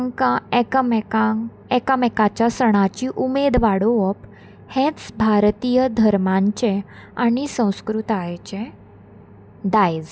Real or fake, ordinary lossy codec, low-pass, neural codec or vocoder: real; none; none; none